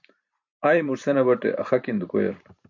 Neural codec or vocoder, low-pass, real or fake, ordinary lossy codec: vocoder, 44.1 kHz, 128 mel bands every 512 samples, BigVGAN v2; 7.2 kHz; fake; MP3, 48 kbps